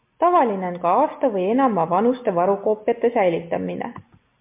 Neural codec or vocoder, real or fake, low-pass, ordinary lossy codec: none; real; 3.6 kHz; MP3, 32 kbps